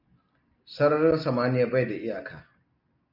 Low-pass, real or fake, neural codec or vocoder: 5.4 kHz; real; none